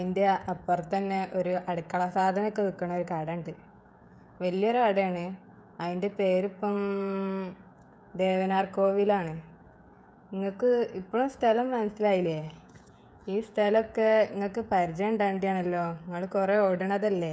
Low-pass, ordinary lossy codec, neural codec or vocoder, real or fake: none; none; codec, 16 kHz, 16 kbps, FreqCodec, smaller model; fake